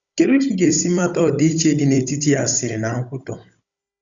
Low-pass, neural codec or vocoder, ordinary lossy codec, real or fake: 7.2 kHz; codec, 16 kHz, 16 kbps, FunCodec, trained on Chinese and English, 50 frames a second; Opus, 64 kbps; fake